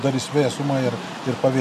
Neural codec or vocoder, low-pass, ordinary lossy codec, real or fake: none; 14.4 kHz; MP3, 96 kbps; real